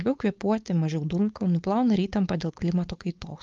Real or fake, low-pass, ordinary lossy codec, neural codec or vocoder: fake; 7.2 kHz; Opus, 32 kbps; codec, 16 kHz, 4.8 kbps, FACodec